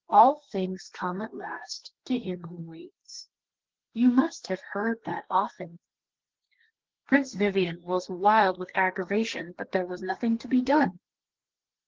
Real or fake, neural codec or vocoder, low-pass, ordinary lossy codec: fake; codec, 32 kHz, 1.9 kbps, SNAC; 7.2 kHz; Opus, 16 kbps